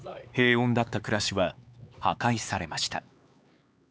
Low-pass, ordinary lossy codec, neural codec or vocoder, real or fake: none; none; codec, 16 kHz, 4 kbps, X-Codec, HuBERT features, trained on LibriSpeech; fake